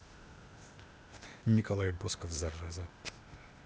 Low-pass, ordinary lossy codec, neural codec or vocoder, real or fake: none; none; codec, 16 kHz, 0.8 kbps, ZipCodec; fake